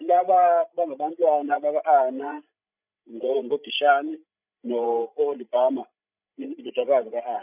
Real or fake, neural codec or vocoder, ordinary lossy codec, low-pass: fake; codec, 16 kHz, 16 kbps, FreqCodec, larger model; none; 3.6 kHz